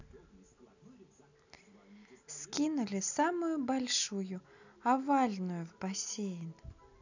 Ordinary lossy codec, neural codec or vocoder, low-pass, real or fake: none; none; 7.2 kHz; real